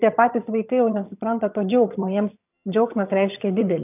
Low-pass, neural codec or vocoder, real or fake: 3.6 kHz; vocoder, 22.05 kHz, 80 mel bands, HiFi-GAN; fake